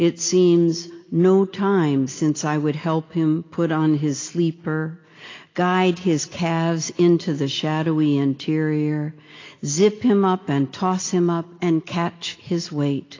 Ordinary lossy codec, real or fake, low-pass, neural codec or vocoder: AAC, 32 kbps; real; 7.2 kHz; none